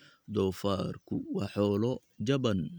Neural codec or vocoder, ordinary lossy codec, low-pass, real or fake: none; none; none; real